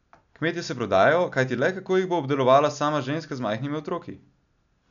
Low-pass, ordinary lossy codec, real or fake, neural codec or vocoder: 7.2 kHz; none; real; none